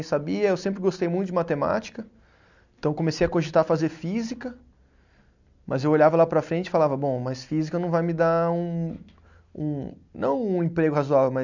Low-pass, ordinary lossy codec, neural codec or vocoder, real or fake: 7.2 kHz; none; none; real